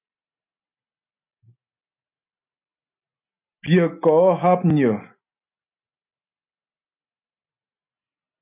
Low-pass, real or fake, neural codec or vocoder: 3.6 kHz; real; none